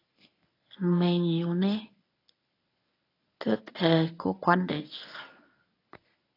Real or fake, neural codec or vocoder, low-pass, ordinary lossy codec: fake; codec, 24 kHz, 0.9 kbps, WavTokenizer, medium speech release version 1; 5.4 kHz; AAC, 24 kbps